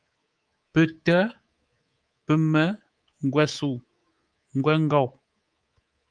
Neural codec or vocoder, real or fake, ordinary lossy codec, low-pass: codec, 24 kHz, 3.1 kbps, DualCodec; fake; Opus, 32 kbps; 9.9 kHz